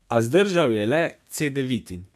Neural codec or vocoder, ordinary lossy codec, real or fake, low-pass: codec, 32 kHz, 1.9 kbps, SNAC; none; fake; 14.4 kHz